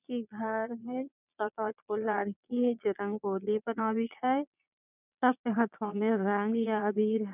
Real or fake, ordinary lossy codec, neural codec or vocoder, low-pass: fake; none; vocoder, 22.05 kHz, 80 mel bands, Vocos; 3.6 kHz